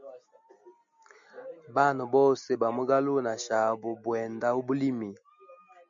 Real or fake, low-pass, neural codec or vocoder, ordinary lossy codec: real; 7.2 kHz; none; MP3, 64 kbps